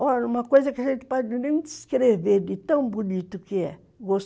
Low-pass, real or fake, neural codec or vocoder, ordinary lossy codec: none; real; none; none